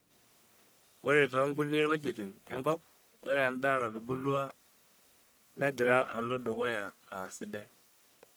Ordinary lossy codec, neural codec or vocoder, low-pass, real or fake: none; codec, 44.1 kHz, 1.7 kbps, Pupu-Codec; none; fake